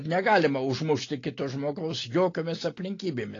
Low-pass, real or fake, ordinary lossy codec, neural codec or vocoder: 7.2 kHz; real; AAC, 32 kbps; none